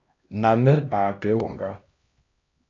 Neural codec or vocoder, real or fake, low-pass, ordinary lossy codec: codec, 16 kHz, 1 kbps, X-Codec, HuBERT features, trained on LibriSpeech; fake; 7.2 kHz; AAC, 32 kbps